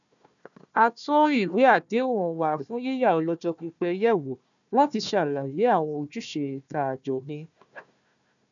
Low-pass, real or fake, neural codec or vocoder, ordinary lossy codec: 7.2 kHz; fake; codec, 16 kHz, 1 kbps, FunCodec, trained on Chinese and English, 50 frames a second; none